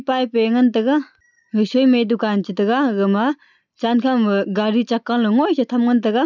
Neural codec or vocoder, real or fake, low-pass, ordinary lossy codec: none; real; 7.2 kHz; none